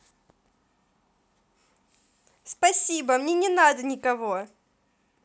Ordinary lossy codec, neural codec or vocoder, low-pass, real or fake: none; none; none; real